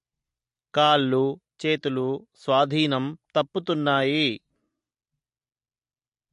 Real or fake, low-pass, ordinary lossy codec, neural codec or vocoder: real; 10.8 kHz; MP3, 48 kbps; none